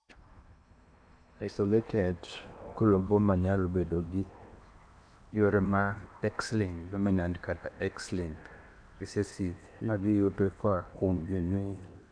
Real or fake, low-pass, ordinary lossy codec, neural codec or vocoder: fake; 9.9 kHz; none; codec, 16 kHz in and 24 kHz out, 0.8 kbps, FocalCodec, streaming, 65536 codes